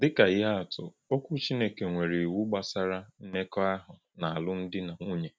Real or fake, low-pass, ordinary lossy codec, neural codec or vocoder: real; none; none; none